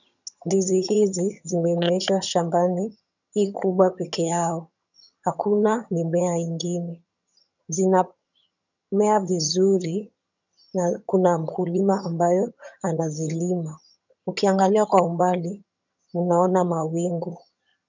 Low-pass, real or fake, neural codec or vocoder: 7.2 kHz; fake; vocoder, 22.05 kHz, 80 mel bands, HiFi-GAN